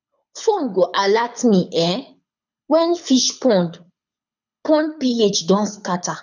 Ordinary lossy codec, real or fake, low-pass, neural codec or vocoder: none; fake; 7.2 kHz; codec, 24 kHz, 6 kbps, HILCodec